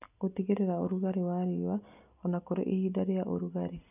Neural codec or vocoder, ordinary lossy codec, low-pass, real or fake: none; none; 3.6 kHz; real